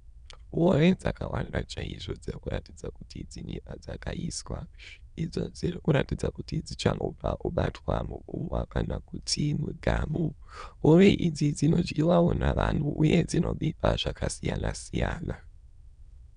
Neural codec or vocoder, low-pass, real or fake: autoencoder, 22.05 kHz, a latent of 192 numbers a frame, VITS, trained on many speakers; 9.9 kHz; fake